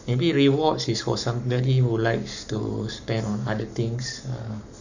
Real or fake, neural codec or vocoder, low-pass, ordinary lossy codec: fake; codec, 44.1 kHz, 7.8 kbps, Pupu-Codec; 7.2 kHz; none